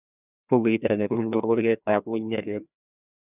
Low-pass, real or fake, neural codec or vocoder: 3.6 kHz; fake; codec, 16 kHz, 1 kbps, FreqCodec, larger model